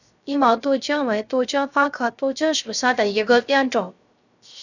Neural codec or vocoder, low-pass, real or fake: codec, 16 kHz, 0.3 kbps, FocalCodec; 7.2 kHz; fake